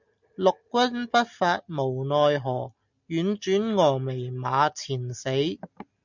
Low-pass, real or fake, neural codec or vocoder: 7.2 kHz; real; none